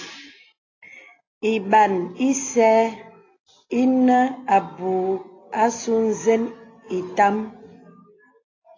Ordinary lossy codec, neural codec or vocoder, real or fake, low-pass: AAC, 32 kbps; none; real; 7.2 kHz